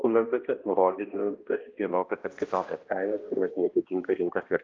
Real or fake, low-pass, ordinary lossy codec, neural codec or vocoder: fake; 7.2 kHz; Opus, 24 kbps; codec, 16 kHz, 1 kbps, X-Codec, HuBERT features, trained on balanced general audio